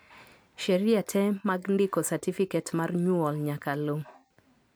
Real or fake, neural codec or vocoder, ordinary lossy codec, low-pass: real; none; none; none